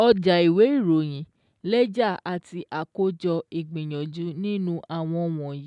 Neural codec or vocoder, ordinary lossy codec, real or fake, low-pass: none; none; real; 10.8 kHz